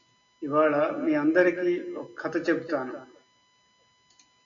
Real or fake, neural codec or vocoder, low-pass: real; none; 7.2 kHz